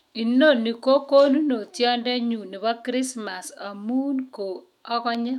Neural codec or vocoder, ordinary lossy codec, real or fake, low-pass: autoencoder, 48 kHz, 128 numbers a frame, DAC-VAE, trained on Japanese speech; none; fake; 19.8 kHz